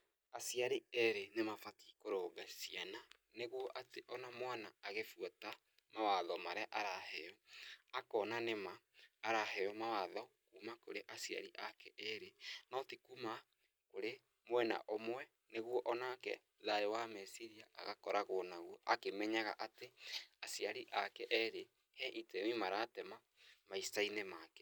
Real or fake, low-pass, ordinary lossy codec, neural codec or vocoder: real; none; none; none